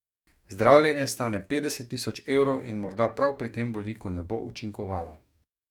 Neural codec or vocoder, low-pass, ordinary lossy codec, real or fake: codec, 44.1 kHz, 2.6 kbps, DAC; 19.8 kHz; none; fake